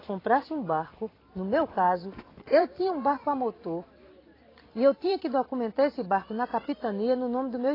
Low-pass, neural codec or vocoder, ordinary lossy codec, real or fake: 5.4 kHz; vocoder, 44.1 kHz, 128 mel bands every 256 samples, BigVGAN v2; AAC, 24 kbps; fake